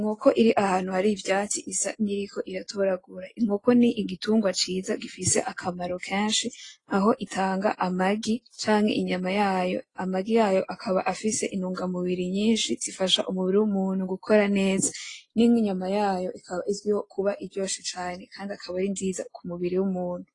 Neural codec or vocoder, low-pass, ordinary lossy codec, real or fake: none; 10.8 kHz; AAC, 32 kbps; real